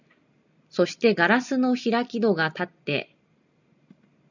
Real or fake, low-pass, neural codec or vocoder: real; 7.2 kHz; none